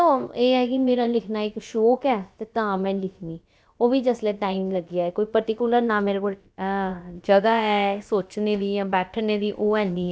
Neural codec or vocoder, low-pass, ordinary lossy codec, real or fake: codec, 16 kHz, about 1 kbps, DyCAST, with the encoder's durations; none; none; fake